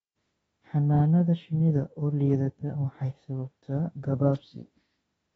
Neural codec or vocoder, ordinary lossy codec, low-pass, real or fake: autoencoder, 48 kHz, 32 numbers a frame, DAC-VAE, trained on Japanese speech; AAC, 24 kbps; 19.8 kHz; fake